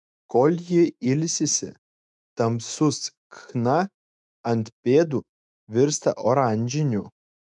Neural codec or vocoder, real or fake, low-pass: autoencoder, 48 kHz, 128 numbers a frame, DAC-VAE, trained on Japanese speech; fake; 10.8 kHz